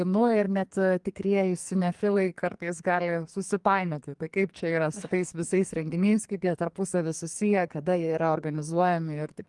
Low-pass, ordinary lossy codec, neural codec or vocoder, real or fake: 10.8 kHz; Opus, 24 kbps; codec, 44.1 kHz, 2.6 kbps, SNAC; fake